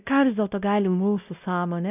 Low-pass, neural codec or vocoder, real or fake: 3.6 kHz; codec, 16 kHz, 0.5 kbps, X-Codec, WavLM features, trained on Multilingual LibriSpeech; fake